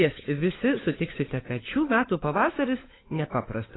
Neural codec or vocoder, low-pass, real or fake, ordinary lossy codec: codec, 16 kHz, 16 kbps, FunCodec, trained on LibriTTS, 50 frames a second; 7.2 kHz; fake; AAC, 16 kbps